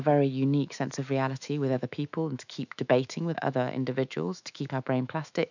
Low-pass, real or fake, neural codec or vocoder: 7.2 kHz; fake; autoencoder, 48 kHz, 128 numbers a frame, DAC-VAE, trained on Japanese speech